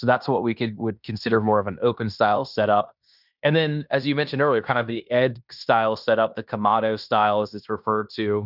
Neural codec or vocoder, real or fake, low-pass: codec, 16 kHz in and 24 kHz out, 0.9 kbps, LongCat-Audio-Codec, fine tuned four codebook decoder; fake; 5.4 kHz